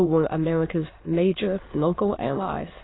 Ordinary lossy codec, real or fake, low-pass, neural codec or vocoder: AAC, 16 kbps; fake; 7.2 kHz; autoencoder, 22.05 kHz, a latent of 192 numbers a frame, VITS, trained on many speakers